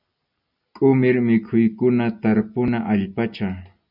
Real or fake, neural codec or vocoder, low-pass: real; none; 5.4 kHz